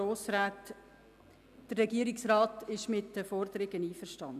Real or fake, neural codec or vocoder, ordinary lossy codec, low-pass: real; none; none; 14.4 kHz